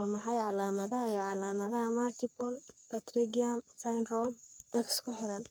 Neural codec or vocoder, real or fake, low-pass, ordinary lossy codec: codec, 44.1 kHz, 3.4 kbps, Pupu-Codec; fake; none; none